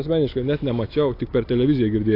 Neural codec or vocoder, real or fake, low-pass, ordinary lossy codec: none; real; 5.4 kHz; AAC, 32 kbps